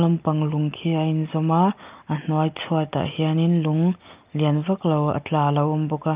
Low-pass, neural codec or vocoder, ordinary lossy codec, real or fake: 3.6 kHz; none; Opus, 24 kbps; real